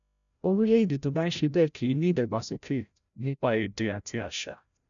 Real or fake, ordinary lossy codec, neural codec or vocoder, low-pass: fake; none; codec, 16 kHz, 0.5 kbps, FreqCodec, larger model; 7.2 kHz